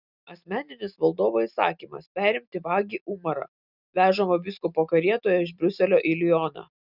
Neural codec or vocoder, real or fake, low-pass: none; real; 5.4 kHz